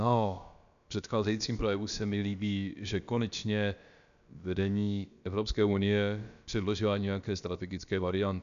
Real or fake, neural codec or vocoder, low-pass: fake; codec, 16 kHz, about 1 kbps, DyCAST, with the encoder's durations; 7.2 kHz